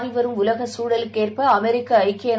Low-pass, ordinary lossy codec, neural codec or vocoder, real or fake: none; none; none; real